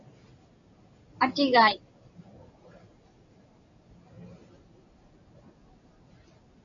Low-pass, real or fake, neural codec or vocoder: 7.2 kHz; real; none